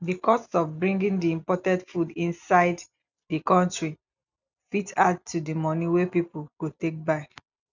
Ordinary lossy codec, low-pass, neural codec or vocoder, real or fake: none; 7.2 kHz; none; real